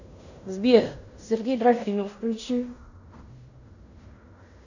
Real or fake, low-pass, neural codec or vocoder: fake; 7.2 kHz; codec, 16 kHz in and 24 kHz out, 0.9 kbps, LongCat-Audio-Codec, fine tuned four codebook decoder